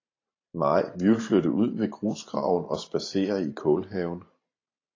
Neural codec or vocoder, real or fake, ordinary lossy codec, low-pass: vocoder, 44.1 kHz, 128 mel bands every 512 samples, BigVGAN v2; fake; AAC, 32 kbps; 7.2 kHz